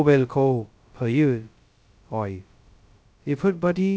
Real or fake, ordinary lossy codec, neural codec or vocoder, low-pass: fake; none; codec, 16 kHz, 0.2 kbps, FocalCodec; none